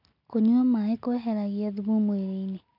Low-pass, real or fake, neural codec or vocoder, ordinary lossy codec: 5.4 kHz; real; none; none